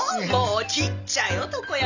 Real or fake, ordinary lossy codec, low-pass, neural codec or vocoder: real; none; 7.2 kHz; none